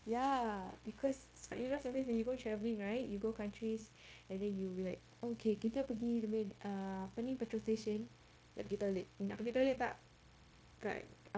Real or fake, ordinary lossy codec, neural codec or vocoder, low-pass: fake; none; codec, 16 kHz, 0.9 kbps, LongCat-Audio-Codec; none